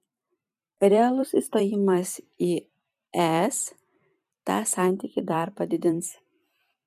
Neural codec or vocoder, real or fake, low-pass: vocoder, 44.1 kHz, 128 mel bands every 256 samples, BigVGAN v2; fake; 14.4 kHz